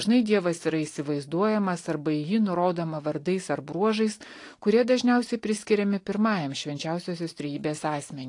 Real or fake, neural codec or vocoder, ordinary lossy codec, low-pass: real; none; AAC, 48 kbps; 10.8 kHz